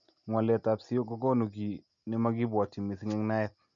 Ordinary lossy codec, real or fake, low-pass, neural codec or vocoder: none; real; 7.2 kHz; none